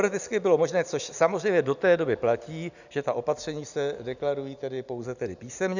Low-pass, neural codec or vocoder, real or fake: 7.2 kHz; none; real